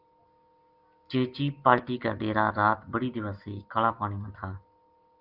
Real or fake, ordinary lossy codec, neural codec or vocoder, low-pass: real; Opus, 32 kbps; none; 5.4 kHz